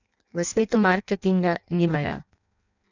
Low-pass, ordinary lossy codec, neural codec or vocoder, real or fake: 7.2 kHz; none; codec, 16 kHz in and 24 kHz out, 0.6 kbps, FireRedTTS-2 codec; fake